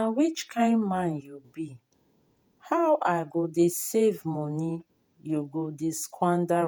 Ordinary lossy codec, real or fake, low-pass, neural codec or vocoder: none; fake; none; vocoder, 48 kHz, 128 mel bands, Vocos